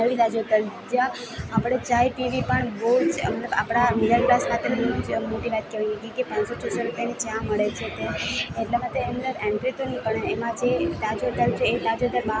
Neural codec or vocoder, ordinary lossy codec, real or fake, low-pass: none; none; real; none